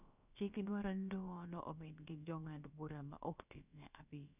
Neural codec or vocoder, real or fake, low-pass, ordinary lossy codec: codec, 16 kHz, about 1 kbps, DyCAST, with the encoder's durations; fake; 3.6 kHz; none